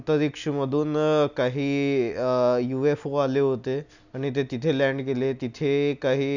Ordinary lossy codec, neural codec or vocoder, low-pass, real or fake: none; none; 7.2 kHz; real